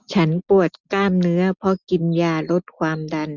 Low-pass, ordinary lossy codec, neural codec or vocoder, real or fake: 7.2 kHz; none; none; real